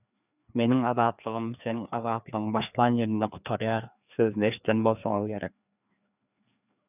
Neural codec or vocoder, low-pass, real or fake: codec, 16 kHz, 2 kbps, FreqCodec, larger model; 3.6 kHz; fake